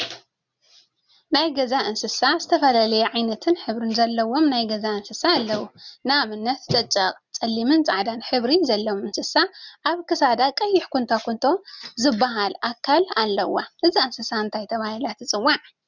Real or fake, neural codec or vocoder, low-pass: real; none; 7.2 kHz